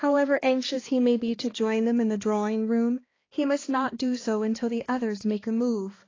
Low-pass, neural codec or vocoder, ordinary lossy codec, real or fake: 7.2 kHz; codec, 16 kHz, 2 kbps, X-Codec, HuBERT features, trained on balanced general audio; AAC, 32 kbps; fake